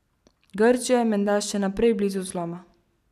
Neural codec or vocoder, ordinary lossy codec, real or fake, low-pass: none; none; real; 14.4 kHz